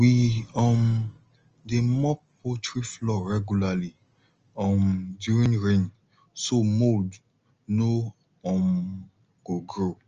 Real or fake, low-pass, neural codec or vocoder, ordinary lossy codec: real; 7.2 kHz; none; Opus, 24 kbps